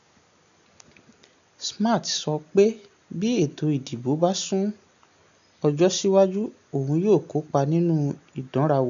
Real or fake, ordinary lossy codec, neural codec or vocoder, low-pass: real; none; none; 7.2 kHz